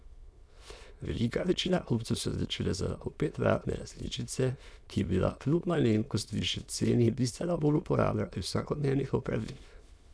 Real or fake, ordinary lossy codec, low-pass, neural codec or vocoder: fake; none; none; autoencoder, 22.05 kHz, a latent of 192 numbers a frame, VITS, trained on many speakers